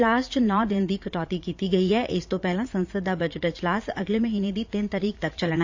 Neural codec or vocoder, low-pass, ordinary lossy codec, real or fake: vocoder, 44.1 kHz, 80 mel bands, Vocos; 7.2 kHz; AAC, 48 kbps; fake